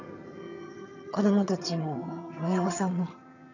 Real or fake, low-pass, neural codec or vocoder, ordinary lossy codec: fake; 7.2 kHz; vocoder, 22.05 kHz, 80 mel bands, HiFi-GAN; none